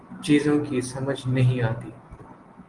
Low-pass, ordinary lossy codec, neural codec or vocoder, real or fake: 10.8 kHz; Opus, 24 kbps; none; real